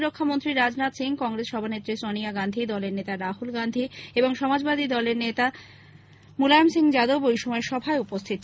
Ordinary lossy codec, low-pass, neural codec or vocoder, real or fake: none; none; none; real